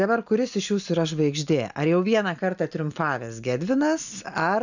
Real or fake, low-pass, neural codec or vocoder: real; 7.2 kHz; none